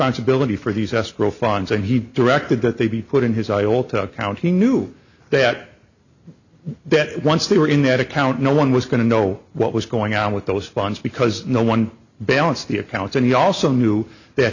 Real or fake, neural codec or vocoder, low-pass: real; none; 7.2 kHz